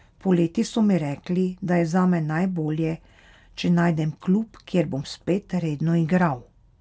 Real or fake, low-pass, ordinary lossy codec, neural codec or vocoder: real; none; none; none